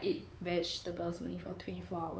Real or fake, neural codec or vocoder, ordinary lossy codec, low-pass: fake; codec, 16 kHz, 4 kbps, X-Codec, WavLM features, trained on Multilingual LibriSpeech; none; none